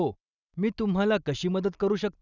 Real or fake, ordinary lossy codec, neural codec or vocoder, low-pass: real; none; none; 7.2 kHz